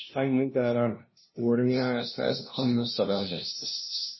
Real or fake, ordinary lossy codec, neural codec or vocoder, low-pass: fake; MP3, 24 kbps; codec, 16 kHz, 0.5 kbps, FunCodec, trained on LibriTTS, 25 frames a second; 7.2 kHz